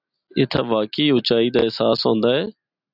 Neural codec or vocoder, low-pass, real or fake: none; 5.4 kHz; real